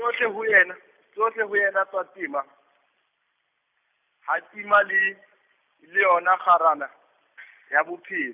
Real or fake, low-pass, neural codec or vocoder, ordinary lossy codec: real; 3.6 kHz; none; none